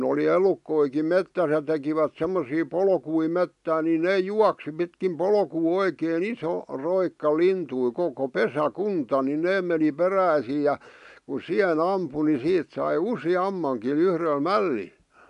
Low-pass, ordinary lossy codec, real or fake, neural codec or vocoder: 10.8 kHz; AAC, 96 kbps; real; none